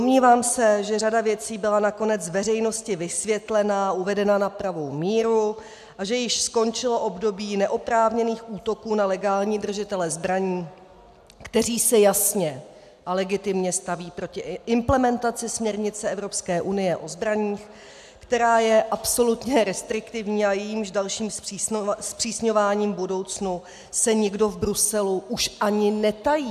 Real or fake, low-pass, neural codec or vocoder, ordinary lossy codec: real; 14.4 kHz; none; AAC, 96 kbps